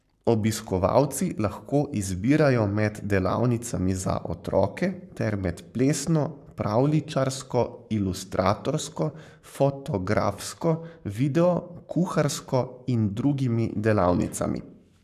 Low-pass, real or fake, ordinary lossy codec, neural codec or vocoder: 14.4 kHz; fake; none; codec, 44.1 kHz, 7.8 kbps, Pupu-Codec